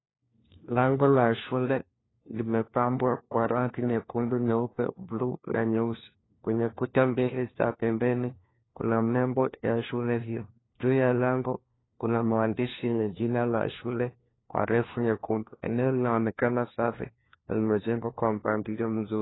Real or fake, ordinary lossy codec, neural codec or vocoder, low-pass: fake; AAC, 16 kbps; codec, 16 kHz, 1 kbps, FunCodec, trained on LibriTTS, 50 frames a second; 7.2 kHz